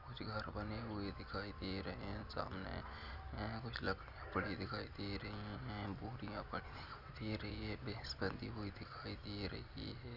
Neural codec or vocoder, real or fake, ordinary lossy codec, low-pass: none; real; none; 5.4 kHz